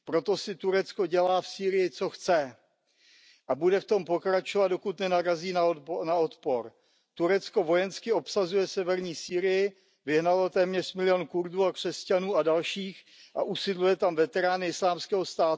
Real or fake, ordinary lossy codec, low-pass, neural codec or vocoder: real; none; none; none